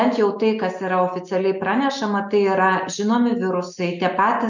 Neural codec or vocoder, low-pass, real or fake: none; 7.2 kHz; real